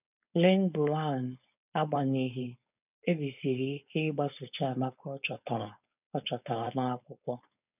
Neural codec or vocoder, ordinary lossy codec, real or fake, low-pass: codec, 16 kHz, 4.8 kbps, FACodec; none; fake; 3.6 kHz